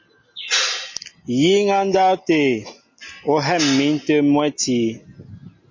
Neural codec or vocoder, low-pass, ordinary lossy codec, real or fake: none; 7.2 kHz; MP3, 32 kbps; real